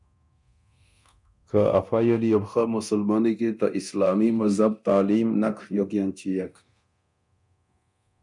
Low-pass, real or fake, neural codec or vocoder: 10.8 kHz; fake; codec, 24 kHz, 0.9 kbps, DualCodec